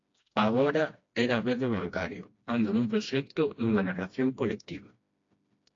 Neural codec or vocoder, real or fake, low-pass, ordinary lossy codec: codec, 16 kHz, 1 kbps, FreqCodec, smaller model; fake; 7.2 kHz; AAC, 48 kbps